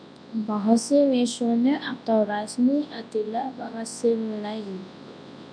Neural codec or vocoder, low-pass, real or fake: codec, 24 kHz, 0.9 kbps, WavTokenizer, large speech release; 9.9 kHz; fake